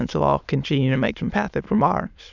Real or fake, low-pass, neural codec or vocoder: fake; 7.2 kHz; autoencoder, 22.05 kHz, a latent of 192 numbers a frame, VITS, trained on many speakers